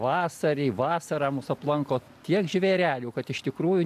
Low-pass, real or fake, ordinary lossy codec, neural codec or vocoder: 14.4 kHz; real; AAC, 96 kbps; none